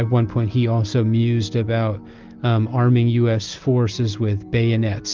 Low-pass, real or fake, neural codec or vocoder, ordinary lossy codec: 7.2 kHz; real; none; Opus, 24 kbps